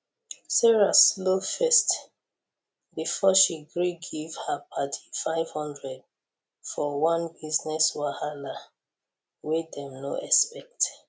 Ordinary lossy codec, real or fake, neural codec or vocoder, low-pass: none; real; none; none